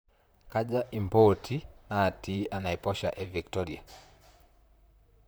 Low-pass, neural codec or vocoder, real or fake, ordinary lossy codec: none; vocoder, 44.1 kHz, 128 mel bands, Pupu-Vocoder; fake; none